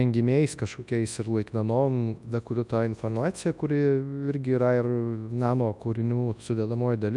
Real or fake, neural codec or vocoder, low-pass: fake; codec, 24 kHz, 0.9 kbps, WavTokenizer, large speech release; 10.8 kHz